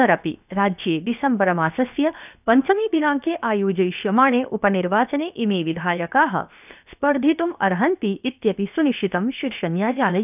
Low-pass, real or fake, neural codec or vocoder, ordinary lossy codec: 3.6 kHz; fake; codec, 16 kHz, 0.7 kbps, FocalCodec; none